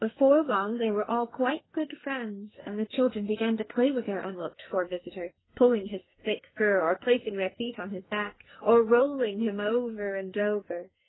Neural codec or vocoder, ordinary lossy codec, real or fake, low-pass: codec, 44.1 kHz, 2.6 kbps, SNAC; AAC, 16 kbps; fake; 7.2 kHz